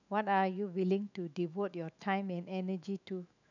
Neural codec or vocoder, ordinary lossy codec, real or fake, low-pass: autoencoder, 48 kHz, 128 numbers a frame, DAC-VAE, trained on Japanese speech; none; fake; 7.2 kHz